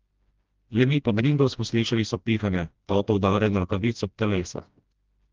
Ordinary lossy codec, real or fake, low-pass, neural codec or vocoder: Opus, 32 kbps; fake; 7.2 kHz; codec, 16 kHz, 1 kbps, FreqCodec, smaller model